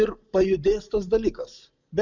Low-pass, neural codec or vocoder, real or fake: 7.2 kHz; none; real